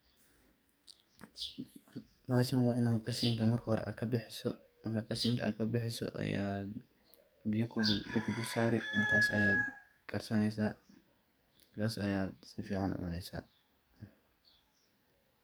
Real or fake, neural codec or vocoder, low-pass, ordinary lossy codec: fake; codec, 44.1 kHz, 2.6 kbps, SNAC; none; none